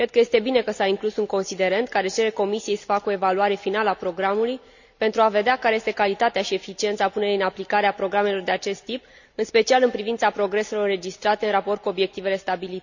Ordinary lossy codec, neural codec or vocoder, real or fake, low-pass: none; none; real; 7.2 kHz